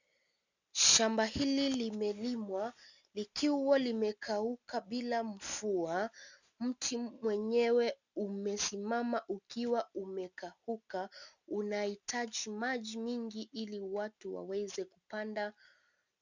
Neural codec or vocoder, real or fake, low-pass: none; real; 7.2 kHz